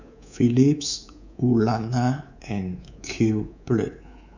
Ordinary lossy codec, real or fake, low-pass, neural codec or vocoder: none; fake; 7.2 kHz; codec, 24 kHz, 3.1 kbps, DualCodec